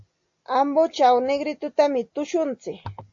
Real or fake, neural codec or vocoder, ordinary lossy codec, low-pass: real; none; AAC, 48 kbps; 7.2 kHz